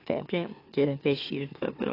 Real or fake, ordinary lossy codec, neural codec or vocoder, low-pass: fake; AAC, 24 kbps; autoencoder, 44.1 kHz, a latent of 192 numbers a frame, MeloTTS; 5.4 kHz